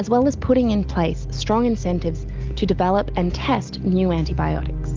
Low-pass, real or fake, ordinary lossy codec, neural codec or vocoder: 7.2 kHz; real; Opus, 24 kbps; none